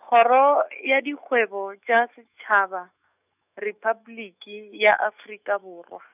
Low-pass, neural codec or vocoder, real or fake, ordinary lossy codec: 3.6 kHz; autoencoder, 48 kHz, 128 numbers a frame, DAC-VAE, trained on Japanese speech; fake; none